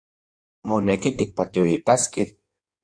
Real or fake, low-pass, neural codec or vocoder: fake; 9.9 kHz; codec, 16 kHz in and 24 kHz out, 1.1 kbps, FireRedTTS-2 codec